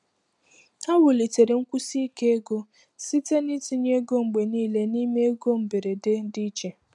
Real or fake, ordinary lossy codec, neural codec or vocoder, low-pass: real; none; none; 10.8 kHz